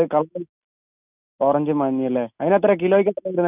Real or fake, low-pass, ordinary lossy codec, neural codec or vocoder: real; 3.6 kHz; none; none